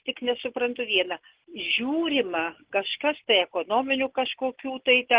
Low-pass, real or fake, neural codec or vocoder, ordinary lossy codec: 3.6 kHz; real; none; Opus, 16 kbps